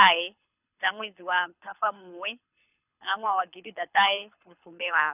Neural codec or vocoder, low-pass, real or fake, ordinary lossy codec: codec, 24 kHz, 6 kbps, HILCodec; 3.6 kHz; fake; none